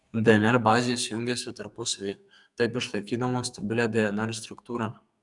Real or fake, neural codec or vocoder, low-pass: fake; codec, 44.1 kHz, 2.6 kbps, SNAC; 10.8 kHz